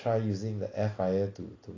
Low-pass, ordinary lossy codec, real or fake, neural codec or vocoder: 7.2 kHz; AAC, 32 kbps; real; none